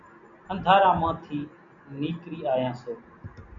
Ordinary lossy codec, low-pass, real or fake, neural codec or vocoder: MP3, 96 kbps; 7.2 kHz; real; none